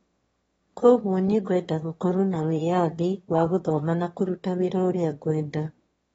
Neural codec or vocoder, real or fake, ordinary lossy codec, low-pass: autoencoder, 22.05 kHz, a latent of 192 numbers a frame, VITS, trained on one speaker; fake; AAC, 24 kbps; 9.9 kHz